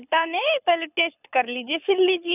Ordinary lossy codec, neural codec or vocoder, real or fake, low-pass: none; codec, 24 kHz, 6 kbps, HILCodec; fake; 3.6 kHz